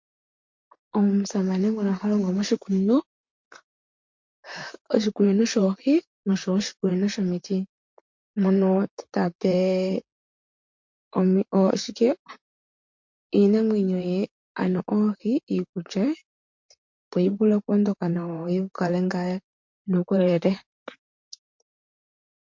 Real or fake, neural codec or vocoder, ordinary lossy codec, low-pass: fake; vocoder, 44.1 kHz, 128 mel bands, Pupu-Vocoder; MP3, 48 kbps; 7.2 kHz